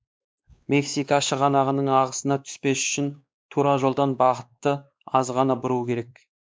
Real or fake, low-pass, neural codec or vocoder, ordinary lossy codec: fake; none; codec, 16 kHz, 2 kbps, X-Codec, WavLM features, trained on Multilingual LibriSpeech; none